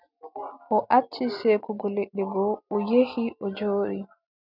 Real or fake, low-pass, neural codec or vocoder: real; 5.4 kHz; none